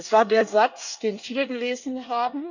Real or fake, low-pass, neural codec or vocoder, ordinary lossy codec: fake; 7.2 kHz; codec, 24 kHz, 1 kbps, SNAC; none